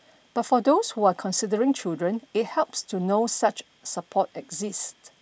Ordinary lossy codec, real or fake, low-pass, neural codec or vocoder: none; real; none; none